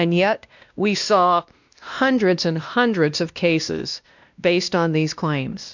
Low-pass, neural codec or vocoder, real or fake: 7.2 kHz; codec, 16 kHz, 1 kbps, X-Codec, WavLM features, trained on Multilingual LibriSpeech; fake